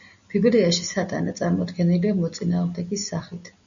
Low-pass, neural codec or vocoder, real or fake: 7.2 kHz; none; real